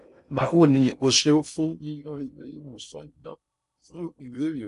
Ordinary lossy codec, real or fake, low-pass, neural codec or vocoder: none; fake; 10.8 kHz; codec, 16 kHz in and 24 kHz out, 0.6 kbps, FocalCodec, streaming, 2048 codes